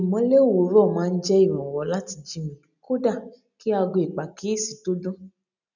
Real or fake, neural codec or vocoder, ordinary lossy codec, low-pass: real; none; none; 7.2 kHz